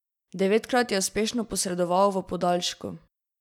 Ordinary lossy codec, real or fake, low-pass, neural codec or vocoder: none; real; 19.8 kHz; none